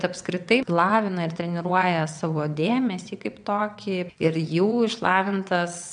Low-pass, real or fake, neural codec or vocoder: 9.9 kHz; fake; vocoder, 22.05 kHz, 80 mel bands, WaveNeXt